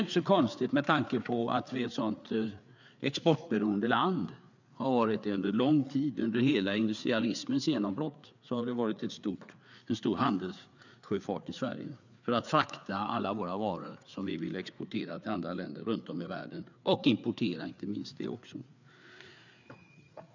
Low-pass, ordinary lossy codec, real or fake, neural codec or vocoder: 7.2 kHz; none; fake; codec, 16 kHz, 4 kbps, FreqCodec, larger model